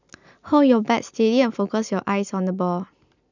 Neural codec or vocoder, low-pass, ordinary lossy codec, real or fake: none; 7.2 kHz; none; real